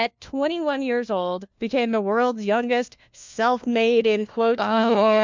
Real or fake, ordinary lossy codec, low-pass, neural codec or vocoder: fake; MP3, 64 kbps; 7.2 kHz; codec, 16 kHz, 1 kbps, FunCodec, trained on LibriTTS, 50 frames a second